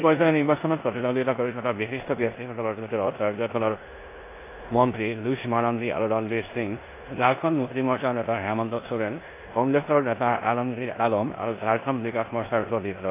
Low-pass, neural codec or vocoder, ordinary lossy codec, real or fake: 3.6 kHz; codec, 16 kHz in and 24 kHz out, 0.9 kbps, LongCat-Audio-Codec, four codebook decoder; AAC, 24 kbps; fake